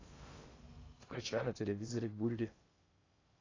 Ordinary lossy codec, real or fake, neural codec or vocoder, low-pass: AAC, 32 kbps; fake; codec, 16 kHz in and 24 kHz out, 0.6 kbps, FocalCodec, streaming, 2048 codes; 7.2 kHz